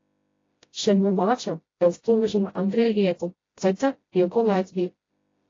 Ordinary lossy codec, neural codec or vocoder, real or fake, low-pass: AAC, 32 kbps; codec, 16 kHz, 0.5 kbps, FreqCodec, smaller model; fake; 7.2 kHz